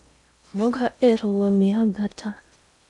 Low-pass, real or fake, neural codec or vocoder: 10.8 kHz; fake; codec, 16 kHz in and 24 kHz out, 0.6 kbps, FocalCodec, streaming, 4096 codes